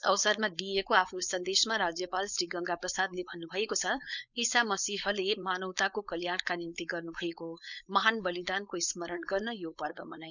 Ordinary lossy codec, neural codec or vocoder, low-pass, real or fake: none; codec, 16 kHz, 4.8 kbps, FACodec; 7.2 kHz; fake